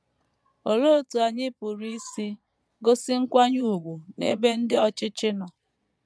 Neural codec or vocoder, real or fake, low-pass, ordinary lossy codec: vocoder, 22.05 kHz, 80 mel bands, Vocos; fake; none; none